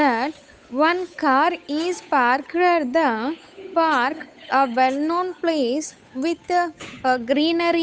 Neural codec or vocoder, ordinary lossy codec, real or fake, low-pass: codec, 16 kHz, 8 kbps, FunCodec, trained on Chinese and English, 25 frames a second; none; fake; none